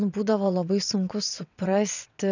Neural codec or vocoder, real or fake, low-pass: vocoder, 22.05 kHz, 80 mel bands, WaveNeXt; fake; 7.2 kHz